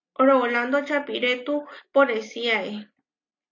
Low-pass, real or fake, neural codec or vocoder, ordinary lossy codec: 7.2 kHz; real; none; AAC, 48 kbps